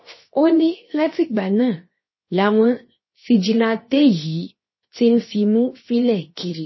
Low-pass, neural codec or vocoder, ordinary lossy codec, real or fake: 7.2 kHz; codec, 16 kHz, 0.7 kbps, FocalCodec; MP3, 24 kbps; fake